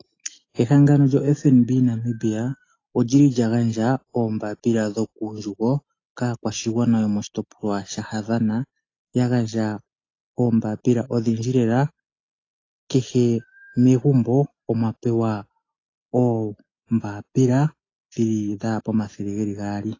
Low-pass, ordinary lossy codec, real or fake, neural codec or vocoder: 7.2 kHz; AAC, 32 kbps; real; none